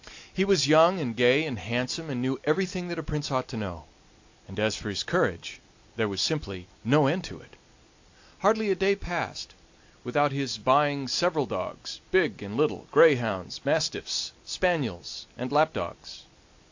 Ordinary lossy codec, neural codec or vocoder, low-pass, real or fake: AAC, 48 kbps; none; 7.2 kHz; real